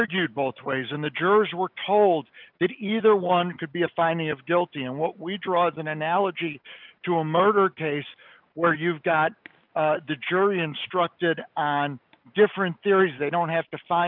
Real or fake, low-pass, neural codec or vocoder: real; 5.4 kHz; none